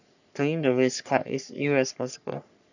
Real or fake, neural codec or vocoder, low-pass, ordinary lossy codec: fake; codec, 44.1 kHz, 3.4 kbps, Pupu-Codec; 7.2 kHz; none